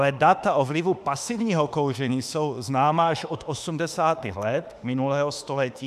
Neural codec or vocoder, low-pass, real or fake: autoencoder, 48 kHz, 32 numbers a frame, DAC-VAE, trained on Japanese speech; 14.4 kHz; fake